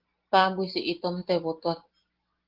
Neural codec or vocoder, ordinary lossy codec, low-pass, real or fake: none; Opus, 16 kbps; 5.4 kHz; real